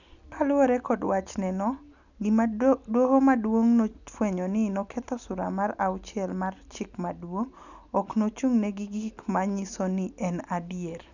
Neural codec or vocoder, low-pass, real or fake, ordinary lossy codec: none; 7.2 kHz; real; none